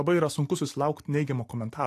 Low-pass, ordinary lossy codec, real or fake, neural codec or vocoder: 14.4 kHz; AAC, 64 kbps; real; none